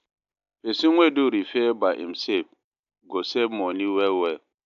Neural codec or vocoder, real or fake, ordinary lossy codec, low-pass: none; real; AAC, 96 kbps; 7.2 kHz